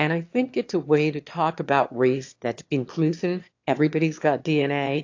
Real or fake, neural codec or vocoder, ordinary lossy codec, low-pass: fake; autoencoder, 22.05 kHz, a latent of 192 numbers a frame, VITS, trained on one speaker; AAC, 48 kbps; 7.2 kHz